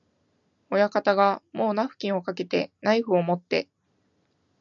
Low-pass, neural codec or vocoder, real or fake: 7.2 kHz; none; real